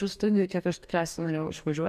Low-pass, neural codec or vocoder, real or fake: 14.4 kHz; codec, 44.1 kHz, 2.6 kbps, DAC; fake